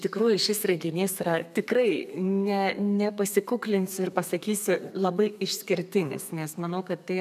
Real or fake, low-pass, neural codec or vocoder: fake; 14.4 kHz; codec, 32 kHz, 1.9 kbps, SNAC